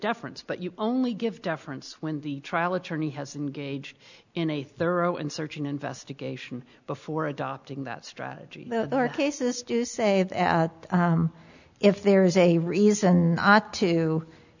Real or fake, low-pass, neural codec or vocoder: real; 7.2 kHz; none